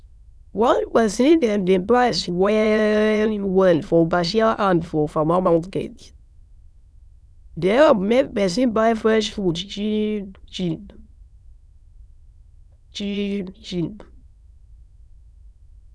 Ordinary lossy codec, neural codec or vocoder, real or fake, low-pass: none; autoencoder, 22.05 kHz, a latent of 192 numbers a frame, VITS, trained on many speakers; fake; none